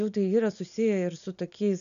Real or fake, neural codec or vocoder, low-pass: real; none; 7.2 kHz